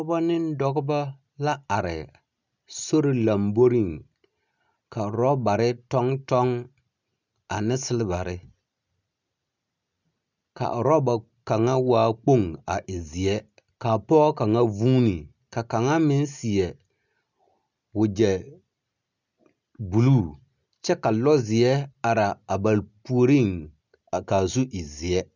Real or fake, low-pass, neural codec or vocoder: real; 7.2 kHz; none